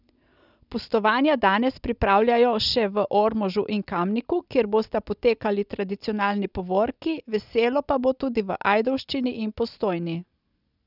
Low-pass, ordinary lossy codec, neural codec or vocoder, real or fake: 5.4 kHz; none; none; real